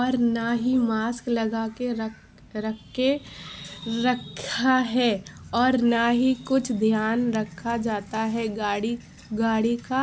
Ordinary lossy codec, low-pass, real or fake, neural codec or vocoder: none; none; real; none